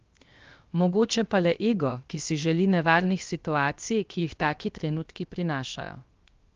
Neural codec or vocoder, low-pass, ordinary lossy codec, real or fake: codec, 16 kHz, 0.7 kbps, FocalCodec; 7.2 kHz; Opus, 32 kbps; fake